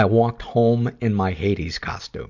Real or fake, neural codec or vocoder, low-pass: real; none; 7.2 kHz